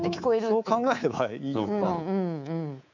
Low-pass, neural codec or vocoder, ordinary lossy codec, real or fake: 7.2 kHz; codec, 24 kHz, 3.1 kbps, DualCodec; none; fake